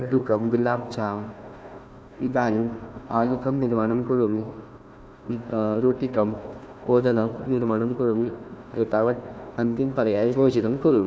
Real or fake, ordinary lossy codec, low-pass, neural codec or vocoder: fake; none; none; codec, 16 kHz, 1 kbps, FunCodec, trained on Chinese and English, 50 frames a second